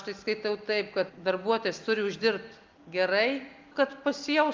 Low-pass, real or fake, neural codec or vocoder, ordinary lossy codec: 7.2 kHz; real; none; Opus, 32 kbps